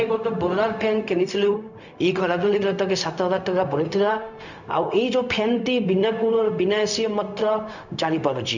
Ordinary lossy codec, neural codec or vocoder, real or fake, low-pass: none; codec, 16 kHz, 0.4 kbps, LongCat-Audio-Codec; fake; 7.2 kHz